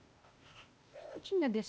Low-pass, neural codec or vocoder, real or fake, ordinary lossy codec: none; codec, 16 kHz, 0.8 kbps, ZipCodec; fake; none